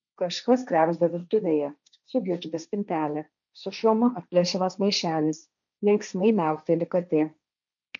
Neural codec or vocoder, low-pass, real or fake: codec, 16 kHz, 1.1 kbps, Voila-Tokenizer; 7.2 kHz; fake